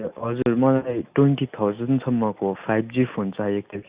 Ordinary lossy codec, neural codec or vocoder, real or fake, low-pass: Opus, 64 kbps; none; real; 3.6 kHz